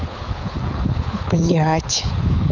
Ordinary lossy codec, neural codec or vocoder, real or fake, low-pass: none; codec, 24 kHz, 6 kbps, HILCodec; fake; 7.2 kHz